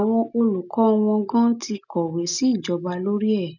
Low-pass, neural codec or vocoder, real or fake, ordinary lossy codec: 7.2 kHz; none; real; none